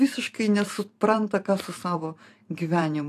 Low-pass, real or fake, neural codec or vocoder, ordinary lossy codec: 14.4 kHz; fake; vocoder, 44.1 kHz, 128 mel bands every 512 samples, BigVGAN v2; AAC, 64 kbps